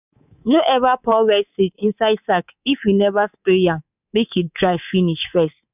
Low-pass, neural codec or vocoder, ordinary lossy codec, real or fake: 3.6 kHz; codec, 24 kHz, 3.1 kbps, DualCodec; none; fake